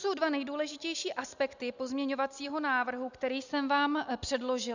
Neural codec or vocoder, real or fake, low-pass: none; real; 7.2 kHz